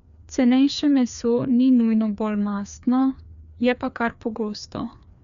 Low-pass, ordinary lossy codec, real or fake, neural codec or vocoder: 7.2 kHz; none; fake; codec, 16 kHz, 2 kbps, FreqCodec, larger model